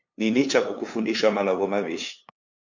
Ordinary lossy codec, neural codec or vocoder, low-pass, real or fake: MP3, 48 kbps; codec, 16 kHz, 2 kbps, FunCodec, trained on LibriTTS, 25 frames a second; 7.2 kHz; fake